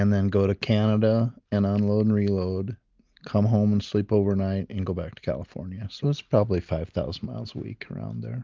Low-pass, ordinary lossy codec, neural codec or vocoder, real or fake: 7.2 kHz; Opus, 32 kbps; none; real